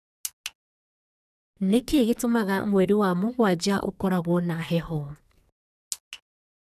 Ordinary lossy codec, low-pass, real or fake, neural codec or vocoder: none; 14.4 kHz; fake; codec, 44.1 kHz, 2.6 kbps, SNAC